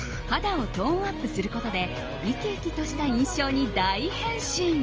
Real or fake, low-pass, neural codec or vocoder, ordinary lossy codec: real; 7.2 kHz; none; Opus, 24 kbps